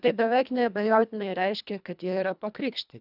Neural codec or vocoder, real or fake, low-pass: codec, 24 kHz, 1.5 kbps, HILCodec; fake; 5.4 kHz